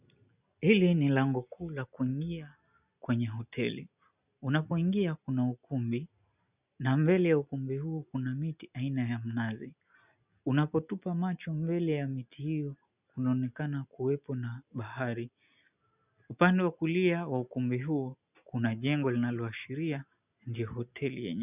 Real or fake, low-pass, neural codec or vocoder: real; 3.6 kHz; none